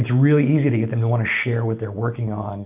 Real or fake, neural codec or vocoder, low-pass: real; none; 3.6 kHz